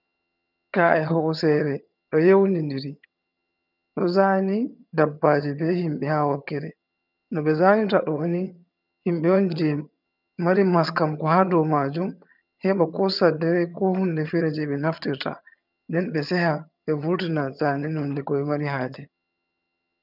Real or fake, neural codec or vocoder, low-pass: fake; vocoder, 22.05 kHz, 80 mel bands, HiFi-GAN; 5.4 kHz